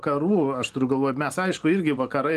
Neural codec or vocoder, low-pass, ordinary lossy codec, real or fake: vocoder, 44.1 kHz, 128 mel bands every 512 samples, BigVGAN v2; 14.4 kHz; Opus, 24 kbps; fake